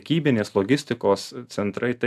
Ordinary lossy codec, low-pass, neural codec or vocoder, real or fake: AAC, 96 kbps; 14.4 kHz; none; real